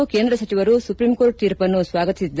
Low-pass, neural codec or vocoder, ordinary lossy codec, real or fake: none; none; none; real